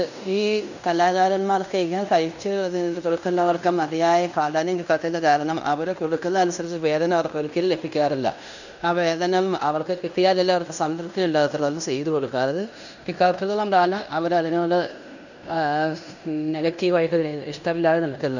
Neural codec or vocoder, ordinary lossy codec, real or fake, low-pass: codec, 16 kHz in and 24 kHz out, 0.9 kbps, LongCat-Audio-Codec, fine tuned four codebook decoder; none; fake; 7.2 kHz